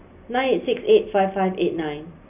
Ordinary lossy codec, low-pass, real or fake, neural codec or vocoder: none; 3.6 kHz; real; none